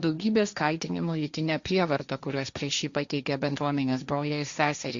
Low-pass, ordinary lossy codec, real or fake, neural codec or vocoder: 7.2 kHz; Opus, 64 kbps; fake; codec, 16 kHz, 1.1 kbps, Voila-Tokenizer